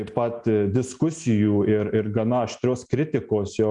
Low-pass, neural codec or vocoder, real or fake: 10.8 kHz; none; real